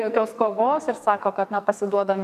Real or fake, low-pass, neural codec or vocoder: fake; 14.4 kHz; codec, 44.1 kHz, 2.6 kbps, SNAC